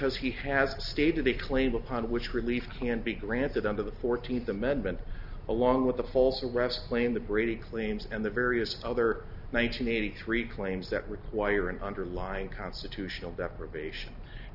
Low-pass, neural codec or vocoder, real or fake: 5.4 kHz; none; real